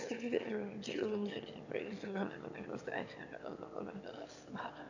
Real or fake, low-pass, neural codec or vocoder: fake; 7.2 kHz; autoencoder, 22.05 kHz, a latent of 192 numbers a frame, VITS, trained on one speaker